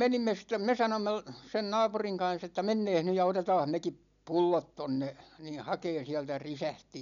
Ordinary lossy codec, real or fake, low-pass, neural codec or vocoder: none; real; 7.2 kHz; none